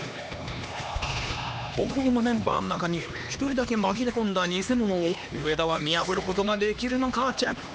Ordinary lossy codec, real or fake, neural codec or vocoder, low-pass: none; fake; codec, 16 kHz, 2 kbps, X-Codec, HuBERT features, trained on LibriSpeech; none